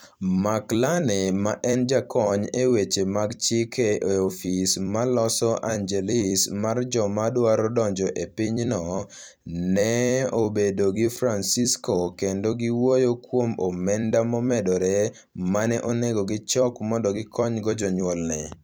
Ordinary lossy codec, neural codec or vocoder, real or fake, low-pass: none; vocoder, 44.1 kHz, 128 mel bands every 256 samples, BigVGAN v2; fake; none